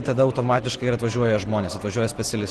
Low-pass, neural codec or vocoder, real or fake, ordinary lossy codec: 10.8 kHz; none; real; Opus, 16 kbps